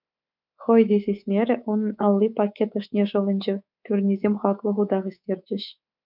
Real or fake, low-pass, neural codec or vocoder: fake; 5.4 kHz; codec, 24 kHz, 3.1 kbps, DualCodec